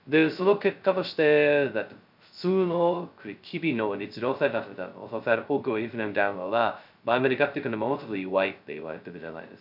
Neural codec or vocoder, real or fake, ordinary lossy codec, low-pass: codec, 16 kHz, 0.2 kbps, FocalCodec; fake; none; 5.4 kHz